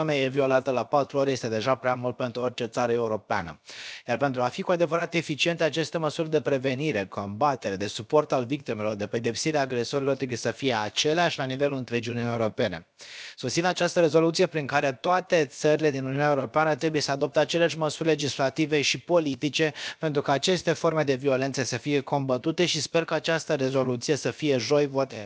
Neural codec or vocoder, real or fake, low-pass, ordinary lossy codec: codec, 16 kHz, about 1 kbps, DyCAST, with the encoder's durations; fake; none; none